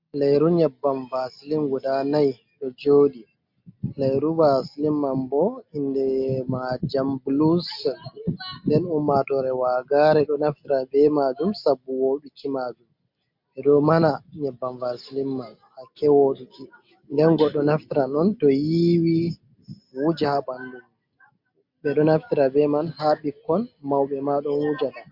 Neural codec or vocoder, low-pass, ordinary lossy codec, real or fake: none; 5.4 kHz; MP3, 48 kbps; real